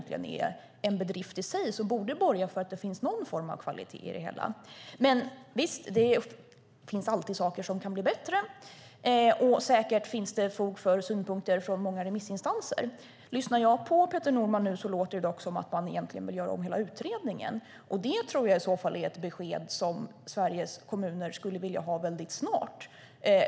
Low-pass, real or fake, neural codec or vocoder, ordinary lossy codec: none; real; none; none